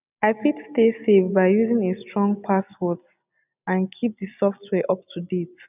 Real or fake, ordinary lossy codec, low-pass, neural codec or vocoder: real; none; 3.6 kHz; none